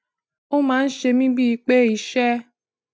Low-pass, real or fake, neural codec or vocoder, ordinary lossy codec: none; real; none; none